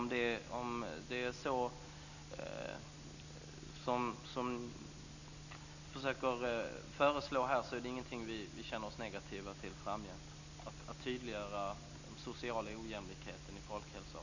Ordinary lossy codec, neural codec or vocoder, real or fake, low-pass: none; none; real; 7.2 kHz